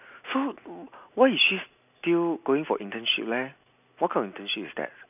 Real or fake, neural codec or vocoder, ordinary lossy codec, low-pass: real; none; none; 3.6 kHz